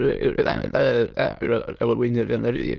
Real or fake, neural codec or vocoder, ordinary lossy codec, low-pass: fake; autoencoder, 22.05 kHz, a latent of 192 numbers a frame, VITS, trained on many speakers; Opus, 32 kbps; 7.2 kHz